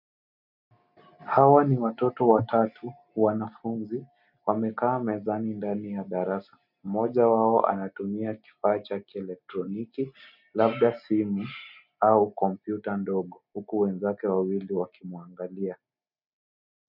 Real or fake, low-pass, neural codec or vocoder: real; 5.4 kHz; none